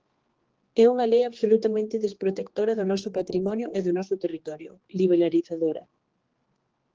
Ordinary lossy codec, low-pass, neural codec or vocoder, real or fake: Opus, 16 kbps; 7.2 kHz; codec, 16 kHz, 2 kbps, X-Codec, HuBERT features, trained on general audio; fake